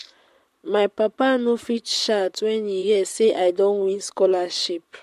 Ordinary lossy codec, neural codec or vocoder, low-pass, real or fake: MP3, 64 kbps; vocoder, 44.1 kHz, 128 mel bands, Pupu-Vocoder; 14.4 kHz; fake